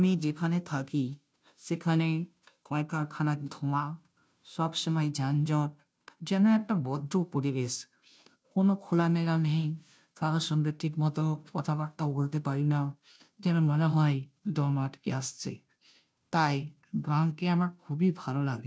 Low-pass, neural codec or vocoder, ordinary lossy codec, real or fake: none; codec, 16 kHz, 0.5 kbps, FunCodec, trained on Chinese and English, 25 frames a second; none; fake